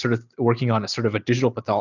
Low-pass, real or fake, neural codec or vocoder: 7.2 kHz; real; none